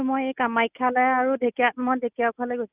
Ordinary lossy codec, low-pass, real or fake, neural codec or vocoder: Opus, 64 kbps; 3.6 kHz; real; none